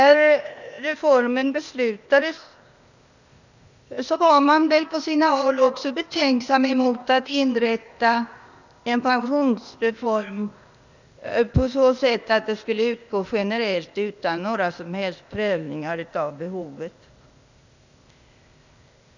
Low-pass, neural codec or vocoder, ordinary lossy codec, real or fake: 7.2 kHz; codec, 16 kHz, 0.8 kbps, ZipCodec; none; fake